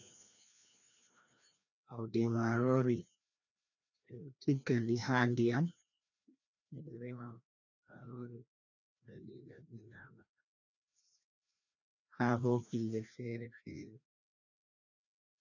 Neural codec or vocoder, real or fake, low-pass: codec, 16 kHz, 1 kbps, FreqCodec, larger model; fake; 7.2 kHz